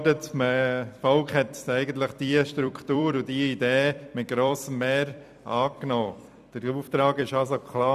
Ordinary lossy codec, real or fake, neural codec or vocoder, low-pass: none; fake; vocoder, 44.1 kHz, 128 mel bands every 256 samples, BigVGAN v2; 14.4 kHz